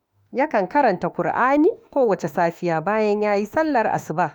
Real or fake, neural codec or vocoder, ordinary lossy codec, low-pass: fake; autoencoder, 48 kHz, 32 numbers a frame, DAC-VAE, trained on Japanese speech; none; none